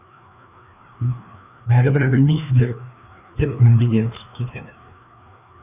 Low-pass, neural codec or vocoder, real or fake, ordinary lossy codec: 3.6 kHz; codec, 16 kHz, 2 kbps, FreqCodec, larger model; fake; none